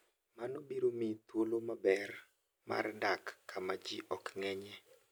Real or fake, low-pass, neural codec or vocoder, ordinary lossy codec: real; none; none; none